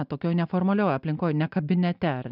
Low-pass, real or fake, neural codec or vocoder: 5.4 kHz; real; none